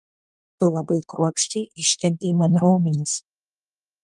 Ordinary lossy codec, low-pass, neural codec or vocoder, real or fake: Opus, 32 kbps; 10.8 kHz; codec, 24 kHz, 1 kbps, SNAC; fake